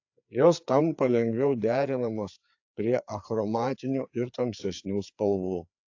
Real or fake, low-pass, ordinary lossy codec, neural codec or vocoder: fake; 7.2 kHz; AAC, 48 kbps; codec, 16 kHz, 4 kbps, FreqCodec, larger model